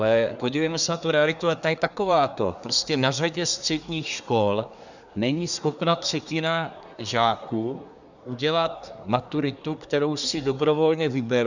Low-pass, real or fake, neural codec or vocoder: 7.2 kHz; fake; codec, 24 kHz, 1 kbps, SNAC